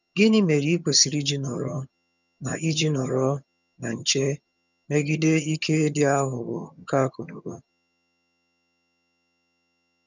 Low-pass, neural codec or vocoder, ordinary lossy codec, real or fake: 7.2 kHz; vocoder, 22.05 kHz, 80 mel bands, HiFi-GAN; none; fake